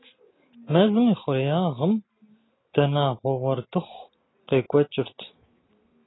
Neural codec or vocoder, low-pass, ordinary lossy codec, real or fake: none; 7.2 kHz; AAC, 16 kbps; real